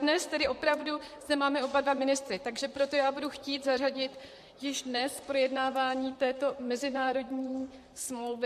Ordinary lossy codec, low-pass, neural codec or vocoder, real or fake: MP3, 64 kbps; 14.4 kHz; vocoder, 44.1 kHz, 128 mel bands, Pupu-Vocoder; fake